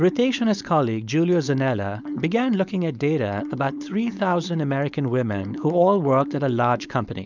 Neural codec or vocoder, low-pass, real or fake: codec, 16 kHz, 4.8 kbps, FACodec; 7.2 kHz; fake